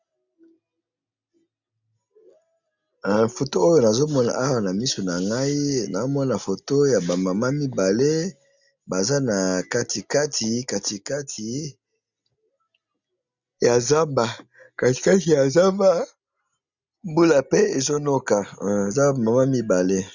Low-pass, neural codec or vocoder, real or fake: 7.2 kHz; none; real